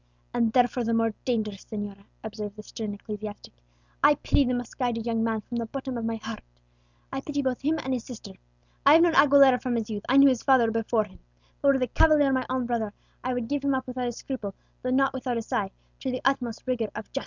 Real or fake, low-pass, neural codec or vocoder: real; 7.2 kHz; none